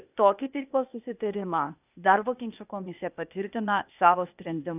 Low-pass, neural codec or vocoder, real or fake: 3.6 kHz; codec, 16 kHz, 0.8 kbps, ZipCodec; fake